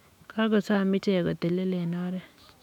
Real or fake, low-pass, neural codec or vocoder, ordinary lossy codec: fake; 19.8 kHz; autoencoder, 48 kHz, 128 numbers a frame, DAC-VAE, trained on Japanese speech; none